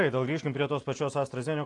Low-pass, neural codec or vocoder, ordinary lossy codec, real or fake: 10.8 kHz; none; AAC, 48 kbps; real